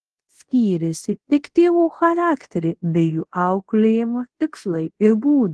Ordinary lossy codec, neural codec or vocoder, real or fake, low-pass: Opus, 16 kbps; codec, 24 kHz, 0.5 kbps, DualCodec; fake; 10.8 kHz